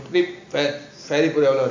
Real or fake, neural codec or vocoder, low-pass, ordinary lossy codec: real; none; 7.2 kHz; MP3, 64 kbps